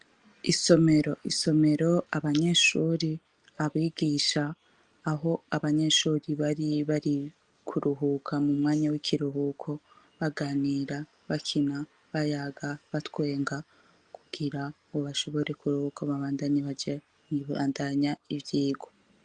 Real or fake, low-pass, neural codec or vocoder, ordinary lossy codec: real; 9.9 kHz; none; Opus, 32 kbps